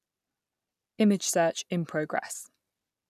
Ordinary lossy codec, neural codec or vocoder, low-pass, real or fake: none; none; 14.4 kHz; real